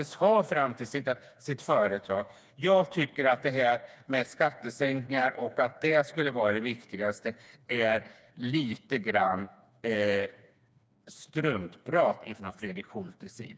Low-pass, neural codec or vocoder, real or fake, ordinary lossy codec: none; codec, 16 kHz, 2 kbps, FreqCodec, smaller model; fake; none